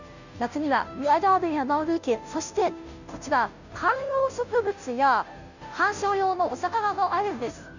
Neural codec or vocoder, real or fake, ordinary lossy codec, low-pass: codec, 16 kHz, 0.5 kbps, FunCodec, trained on Chinese and English, 25 frames a second; fake; none; 7.2 kHz